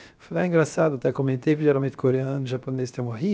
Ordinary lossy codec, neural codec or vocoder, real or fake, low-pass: none; codec, 16 kHz, 0.7 kbps, FocalCodec; fake; none